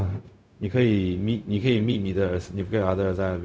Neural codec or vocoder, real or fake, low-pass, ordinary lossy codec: codec, 16 kHz, 0.4 kbps, LongCat-Audio-Codec; fake; none; none